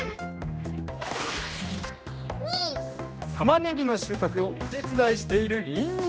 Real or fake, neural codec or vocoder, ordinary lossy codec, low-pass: fake; codec, 16 kHz, 1 kbps, X-Codec, HuBERT features, trained on general audio; none; none